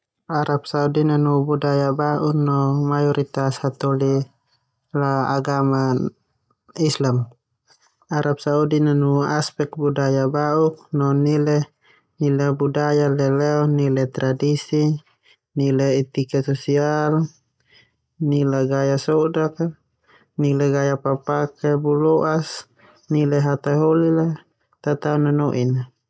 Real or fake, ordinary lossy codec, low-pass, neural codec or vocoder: real; none; none; none